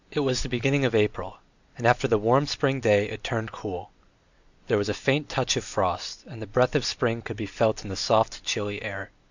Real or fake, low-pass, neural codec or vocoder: real; 7.2 kHz; none